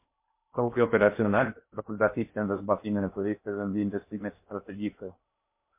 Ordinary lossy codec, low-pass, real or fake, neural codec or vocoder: MP3, 24 kbps; 3.6 kHz; fake; codec, 16 kHz in and 24 kHz out, 0.6 kbps, FocalCodec, streaming, 2048 codes